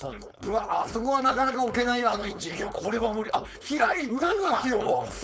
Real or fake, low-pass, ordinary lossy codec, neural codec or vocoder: fake; none; none; codec, 16 kHz, 4.8 kbps, FACodec